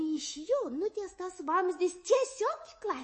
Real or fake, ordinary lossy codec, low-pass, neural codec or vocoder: fake; MP3, 32 kbps; 10.8 kHz; codec, 24 kHz, 3.1 kbps, DualCodec